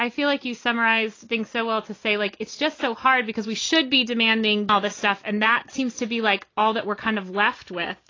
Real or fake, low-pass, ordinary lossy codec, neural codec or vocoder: real; 7.2 kHz; AAC, 32 kbps; none